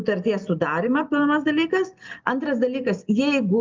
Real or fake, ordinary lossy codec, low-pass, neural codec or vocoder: real; Opus, 24 kbps; 7.2 kHz; none